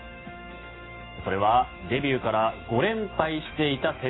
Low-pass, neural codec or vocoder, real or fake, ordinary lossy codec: 7.2 kHz; autoencoder, 48 kHz, 128 numbers a frame, DAC-VAE, trained on Japanese speech; fake; AAC, 16 kbps